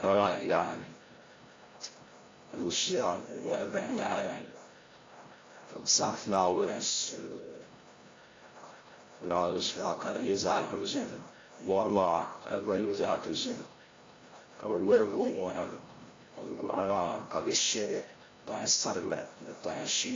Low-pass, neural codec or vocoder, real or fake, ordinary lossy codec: 7.2 kHz; codec, 16 kHz, 0.5 kbps, FreqCodec, larger model; fake; AAC, 32 kbps